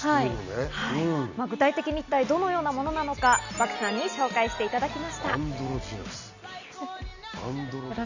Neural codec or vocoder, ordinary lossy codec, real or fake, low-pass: none; none; real; 7.2 kHz